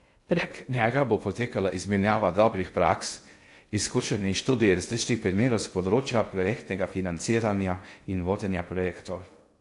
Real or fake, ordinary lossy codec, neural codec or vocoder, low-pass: fake; AAC, 64 kbps; codec, 16 kHz in and 24 kHz out, 0.6 kbps, FocalCodec, streaming, 2048 codes; 10.8 kHz